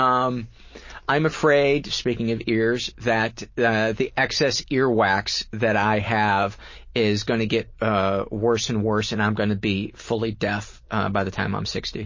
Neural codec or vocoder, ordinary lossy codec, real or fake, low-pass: none; MP3, 32 kbps; real; 7.2 kHz